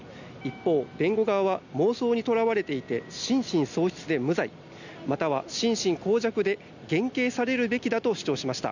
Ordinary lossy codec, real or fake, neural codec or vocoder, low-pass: none; real; none; 7.2 kHz